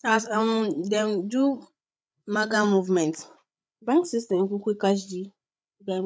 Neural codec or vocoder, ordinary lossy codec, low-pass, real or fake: codec, 16 kHz, 8 kbps, FreqCodec, larger model; none; none; fake